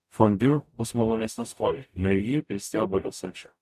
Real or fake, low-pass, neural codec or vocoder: fake; 14.4 kHz; codec, 44.1 kHz, 0.9 kbps, DAC